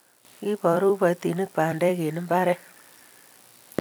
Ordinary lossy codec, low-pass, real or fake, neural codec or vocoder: none; none; fake; vocoder, 44.1 kHz, 128 mel bands every 256 samples, BigVGAN v2